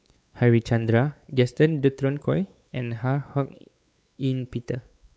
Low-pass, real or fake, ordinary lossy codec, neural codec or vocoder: none; fake; none; codec, 16 kHz, 4 kbps, X-Codec, WavLM features, trained on Multilingual LibriSpeech